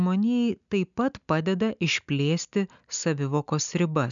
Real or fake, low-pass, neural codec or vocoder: real; 7.2 kHz; none